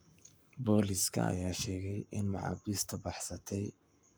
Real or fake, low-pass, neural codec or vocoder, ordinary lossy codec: fake; none; codec, 44.1 kHz, 7.8 kbps, Pupu-Codec; none